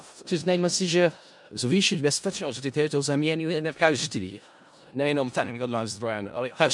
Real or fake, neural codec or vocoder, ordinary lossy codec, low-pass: fake; codec, 16 kHz in and 24 kHz out, 0.4 kbps, LongCat-Audio-Codec, four codebook decoder; MP3, 64 kbps; 10.8 kHz